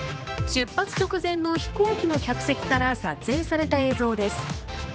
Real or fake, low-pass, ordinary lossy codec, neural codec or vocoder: fake; none; none; codec, 16 kHz, 2 kbps, X-Codec, HuBERT features, trained on general audio